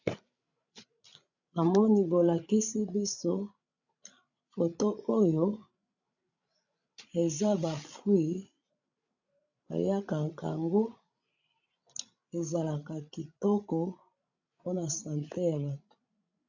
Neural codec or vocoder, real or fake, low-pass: codec, 16 kHz, 16 kbps, FreqCodec, larger model; fake; 7.2 kHz